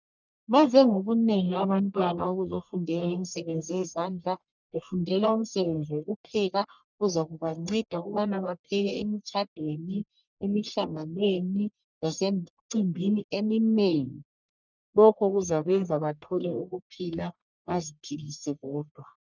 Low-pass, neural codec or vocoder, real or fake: 7.2 kHz; codec, 44.1 kHz, 1.7 kbps, Pupu-Codec; fake